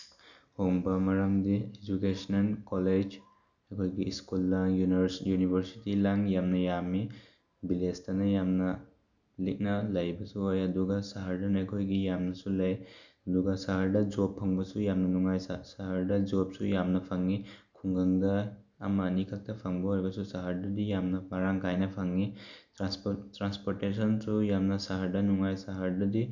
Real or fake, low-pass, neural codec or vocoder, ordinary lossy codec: real; 7.2 kHz; none; none